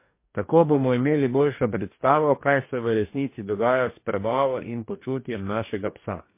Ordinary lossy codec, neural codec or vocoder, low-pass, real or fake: MP3, 24 kbps; codec, 44.1 kHz, 2.6 kbps, DAC; 3.6 kHz; fake